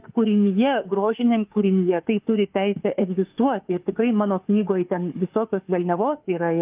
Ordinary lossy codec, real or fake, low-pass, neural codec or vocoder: Opus, 24 kbps; fake; 3.6 kHz; autoencoder, 48 kHz, 32 numbers a frame, DAC-VAE, trained on Japanese speech